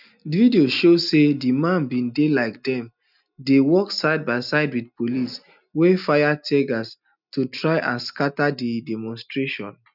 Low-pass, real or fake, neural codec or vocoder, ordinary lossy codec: 5.4 kHz; real; none; none